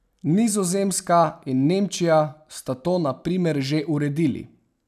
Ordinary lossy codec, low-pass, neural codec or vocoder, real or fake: none; 14.4 kHz; none; real